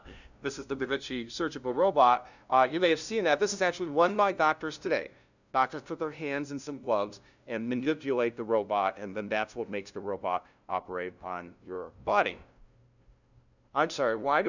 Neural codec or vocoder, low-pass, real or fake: codec, 16 kHz, 0.5 kbps, FunCodec, trained on LibriTTS, 25 frames a second; 7.2 kHz; fake